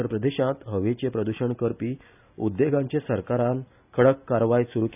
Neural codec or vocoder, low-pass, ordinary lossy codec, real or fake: none; 3.6 kHz; none; real